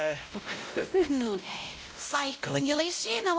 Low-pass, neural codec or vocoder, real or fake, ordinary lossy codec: none; codec, 16 kHz, 0.5 kbps, X-Codec, WavLM features, trained on Multilingual LibriSpeech; fake; none